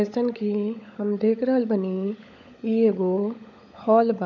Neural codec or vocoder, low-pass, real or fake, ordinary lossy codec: codec, 16 kHz, 16 kbps, FunCodec, trained on LibriTTS, 50 frames a second; 7.2 kHz; fake; none